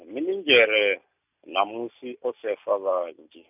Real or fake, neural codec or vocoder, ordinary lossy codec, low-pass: real; none; none; 3.6 kHz